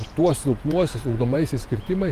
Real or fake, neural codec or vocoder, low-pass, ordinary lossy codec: fake; vocoder, 48 kHz, 128 mel bands, Vocos; 14.4 kHz; Opus, 24 kbps